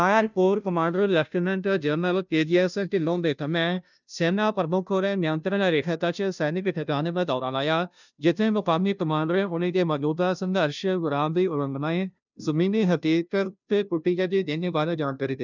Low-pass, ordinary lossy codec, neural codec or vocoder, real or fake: 7.2 kHz; none; codec, 16 kHz, 0.5 kbps, FunCodec, trained on Chinese and English, 25 frames a second; fake